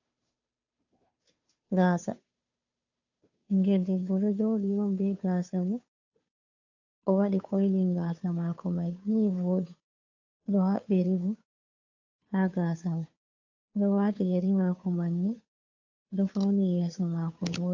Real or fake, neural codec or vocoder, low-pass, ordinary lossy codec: fake; codec, 16 kHz, 2 kbps, FunCodec, trained on Chinese and English, 25 frames a second; 7.2 kHz; AAC, 48 kbps